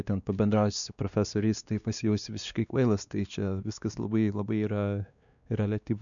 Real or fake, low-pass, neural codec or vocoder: fake; 7.2 kHz; codec, 16 kHz, 2 kbps, X-Codec, WavLM features, trained on Multilingual LibriSpeech